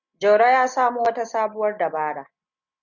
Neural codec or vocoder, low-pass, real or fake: none; 7.2 kHz; real